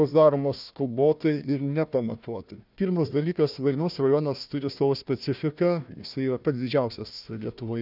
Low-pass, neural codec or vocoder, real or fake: 5.4 kHz; codec, 16 kHz, 1 kbps, FunCodec, trained on Chinese and English, 50 frames a second; fake